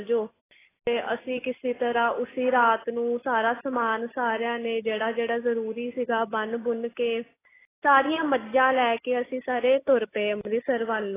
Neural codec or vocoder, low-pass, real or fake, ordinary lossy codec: none; 3.6 kHz; real; AAC, 16 kbps